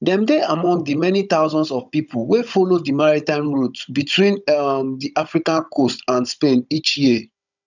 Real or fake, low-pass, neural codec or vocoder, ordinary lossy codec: fake; 7.2 kHz; codec, 16 kHz, 16 kbps, FunCodec, trained on Chinese and English, 50 frames a second; none